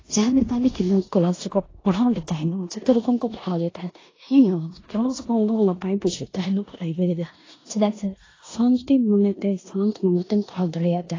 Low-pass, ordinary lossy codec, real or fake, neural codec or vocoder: 7.2 kHz; AAC, 32 kbps; fake; codec, 16 kHz in and 24 kHz out, 0.9 kbps, LongCat-Audio-Codec, four codebook decoder